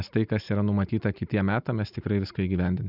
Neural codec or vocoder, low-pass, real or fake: none; 5.4 kHz; real